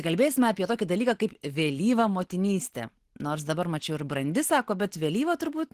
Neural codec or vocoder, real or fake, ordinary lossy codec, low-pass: none; real; Opus, 16 kbps; 14.4 kHz